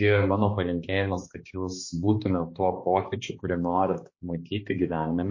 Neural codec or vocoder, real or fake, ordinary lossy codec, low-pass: codec, 16 kHz, 2 kbps, X-Codec, HuBERT features, trained on balanced general audio; fake; MP3, 32 kbps; 7.2 kHz